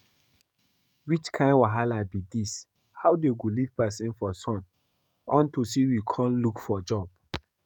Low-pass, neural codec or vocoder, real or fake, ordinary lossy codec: 19.8 kHz; codec, 44.1 kHz, 7.8 kbps, DAC; fake; none